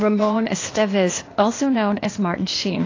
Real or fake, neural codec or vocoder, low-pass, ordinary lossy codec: fake; codec, 16 kHz, 0.8 kbps, ZipCodec; 7.2 kHz; AAC, 32 kbps